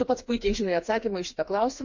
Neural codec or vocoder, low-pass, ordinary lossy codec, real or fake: codec, 16 kHz, 4 kbps, FreqCodec, smaller model; 7.2 kHz; MP3, 48 kbps; fake